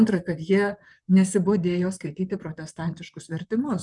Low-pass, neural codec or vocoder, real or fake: 10.8 kHz; vocoder, 44.1 kHz, 128 mel bands, Pupu-Vocoder; fake